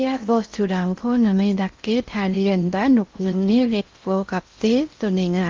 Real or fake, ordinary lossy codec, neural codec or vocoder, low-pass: fake; Opus, 32 kbps; codec, 16 kHz in and 24 kHz out, 0.6 kbps, FocalCodec, streaming, 4096 codes; 7.2 kHz